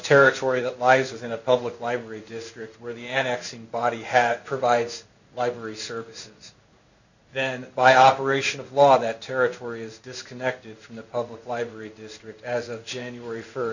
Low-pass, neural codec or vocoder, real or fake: 7.2 kHz; codec, 16 kHz in and 24 kHz out, 1 kbps, XY-Tokenizer; fake